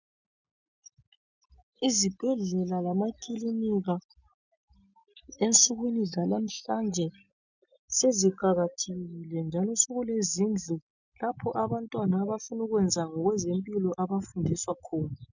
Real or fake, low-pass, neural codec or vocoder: real; 7.2 kHz; none